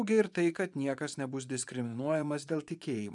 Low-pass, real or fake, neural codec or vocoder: 10.8 kHz; fake; vocoder, 24 kHz, 100 mel bands, Vocos